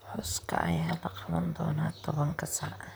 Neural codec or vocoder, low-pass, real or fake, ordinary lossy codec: vocoder, 44.1 kHz, 128 mel bands, Pupu-Vocoder; none; fake; none